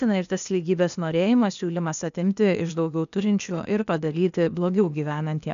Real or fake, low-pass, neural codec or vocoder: fake; 7.2 kHz; codec, 16 kHz, 0.8 kbps, ZipCodec